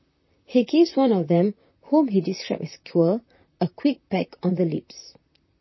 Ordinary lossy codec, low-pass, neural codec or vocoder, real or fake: MP3, 24 kbps; 7.2 kHz; vocoder, 44.1 kHz, 128 mel bands, Pupu-Vocoder; fake